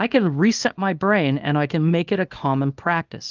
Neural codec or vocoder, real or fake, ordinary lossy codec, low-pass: codec, 24 kHz, 0.9 kbps, WavTokenizer, small release; fake; Opus, 32 kbps; 7.2 kHz